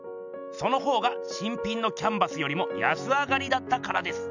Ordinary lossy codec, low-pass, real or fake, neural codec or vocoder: none; 7.2 kHz; real; none